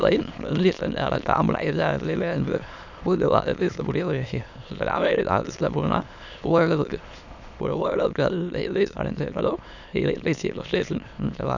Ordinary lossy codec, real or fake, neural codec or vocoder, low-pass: none; fake; autoencoder, 22.05 kHz, a latent of 192 numbers a frame, VITS, trained on many speakers; 7.2 kHz